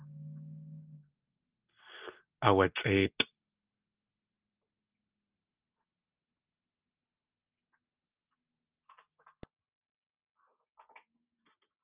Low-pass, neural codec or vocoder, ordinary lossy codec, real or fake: 3.6 kHz; none; Opus, 24 kbps; real